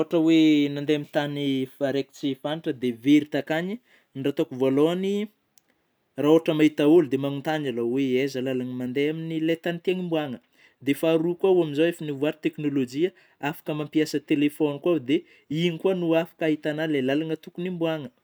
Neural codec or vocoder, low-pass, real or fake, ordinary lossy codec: none; none; real; none